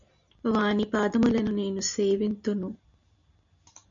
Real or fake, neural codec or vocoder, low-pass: real; none; 7.2 kHz